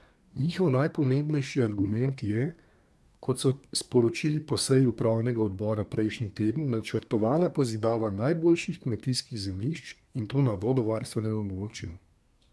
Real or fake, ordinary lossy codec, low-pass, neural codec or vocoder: fake; none; none; codec, 24 kHz, 1 kbps, SNAC